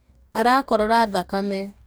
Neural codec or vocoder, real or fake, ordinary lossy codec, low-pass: codec, 44.1 kHz, 2.6 kbps, DAC; fake; none; none